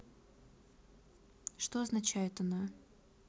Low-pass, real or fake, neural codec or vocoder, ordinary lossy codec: none; real; none; none